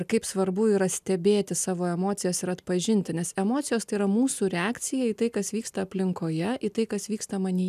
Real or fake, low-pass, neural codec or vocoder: real; 14.4 kHz; none